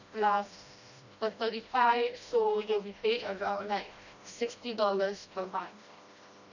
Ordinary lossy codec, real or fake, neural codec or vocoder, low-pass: none; fake; codec, 16 kHz, 1 kbps, FreqCodec, smaller model; 7.2 kHz